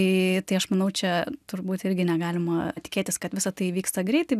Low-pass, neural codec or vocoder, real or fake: 14.4 kHz; none; real